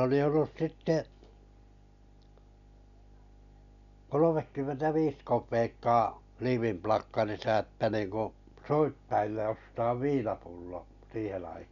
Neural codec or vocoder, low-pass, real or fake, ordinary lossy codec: none; 7.2 kHz; real; none